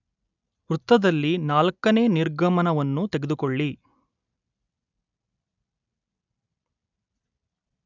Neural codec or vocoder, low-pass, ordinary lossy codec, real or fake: none; 7.2 kHz; none; real